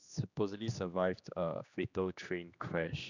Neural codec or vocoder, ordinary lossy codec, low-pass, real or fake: codec, 16 kHz, 2 kbps, X-Codec, HuBERT features, trained on general audio; none; 7.2 kHz; fake